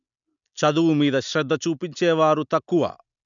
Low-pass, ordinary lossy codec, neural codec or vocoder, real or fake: 7.2 kHz; none; none; real